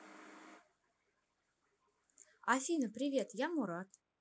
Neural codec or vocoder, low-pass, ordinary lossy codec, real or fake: none; none; none; real